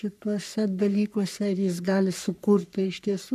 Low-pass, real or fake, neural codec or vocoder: 14.4 kHz; fake; codec, 44.1 kHz, 3.4 kbps, Pupu-Codec